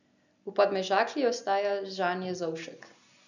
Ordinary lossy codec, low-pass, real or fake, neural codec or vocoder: none; 7.2 kHz; real; none